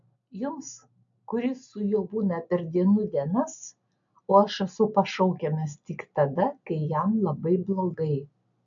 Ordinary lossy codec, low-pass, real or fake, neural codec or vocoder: AAC, 64 kbps; 7.2 kHz; real; none